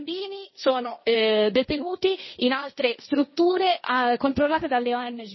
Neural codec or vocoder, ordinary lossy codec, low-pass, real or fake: codec, 16 kHz, 1.1 kbps, Voila-Tokenizer; MP3, 24 kbps; 7.2 kHz; fake